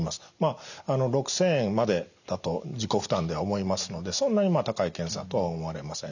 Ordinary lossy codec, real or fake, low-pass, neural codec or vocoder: none; real; 7.2 kHz; none